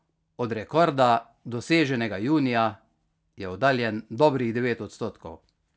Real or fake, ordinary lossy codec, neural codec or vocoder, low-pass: real; none; none; none